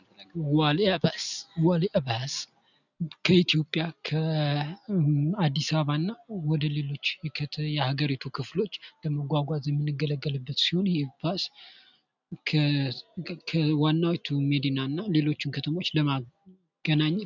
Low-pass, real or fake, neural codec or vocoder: 7.2 kHz; real; none